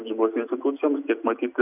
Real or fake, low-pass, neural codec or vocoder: real; 3.6 kHz; none